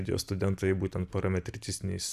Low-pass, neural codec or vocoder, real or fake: 14.4 kHz; none; real